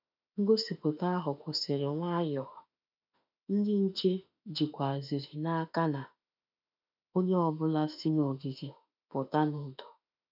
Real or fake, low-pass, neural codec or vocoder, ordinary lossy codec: fake; 5.4 kHz; autoencoder, 48 kHz, 32 numbers a frame, DAC-VAE, trained on Japanese speech; none